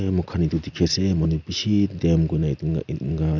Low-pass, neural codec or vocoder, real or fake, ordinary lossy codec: 7.2 kHz; none; real; none